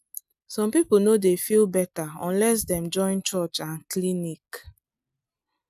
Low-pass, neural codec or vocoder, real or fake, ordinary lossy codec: 14.4 kHz; none; real; none